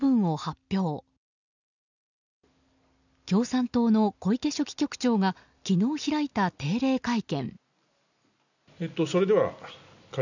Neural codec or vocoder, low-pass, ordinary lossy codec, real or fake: none; 7.2 kHz; none; real